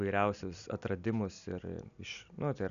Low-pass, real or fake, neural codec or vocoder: 7.2 kHz; real; none